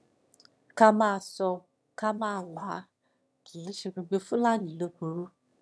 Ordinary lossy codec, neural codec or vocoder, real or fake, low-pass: none; autoencoder, 22.05 kHz, a latent of 192 numbers a frame, VITS, trained on one speaker; fake; none